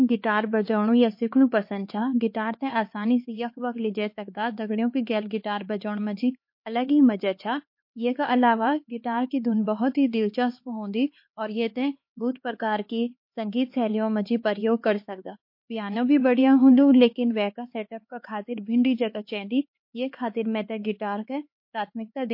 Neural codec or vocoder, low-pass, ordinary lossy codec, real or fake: codec, 16 kHz, 4 kbps, X-Codec, HuBERT features, trained on LibriSpeech; 5.4 kHz; MP3, 32 kbps; fake